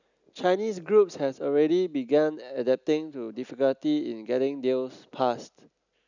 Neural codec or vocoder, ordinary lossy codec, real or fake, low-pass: none; none; real; 7.2 kHz